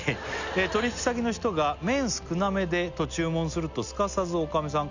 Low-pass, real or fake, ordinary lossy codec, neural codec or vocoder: 7.2 kHz; real; none; none